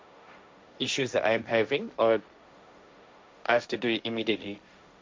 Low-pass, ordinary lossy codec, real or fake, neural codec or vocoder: none; none; fake; codec, 16 kHz, 1.1 kbps, Voila-Tokenizer